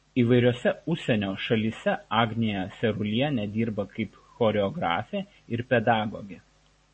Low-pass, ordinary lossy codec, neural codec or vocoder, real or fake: 10.8 kHz; MP3, 32 kbps; none; real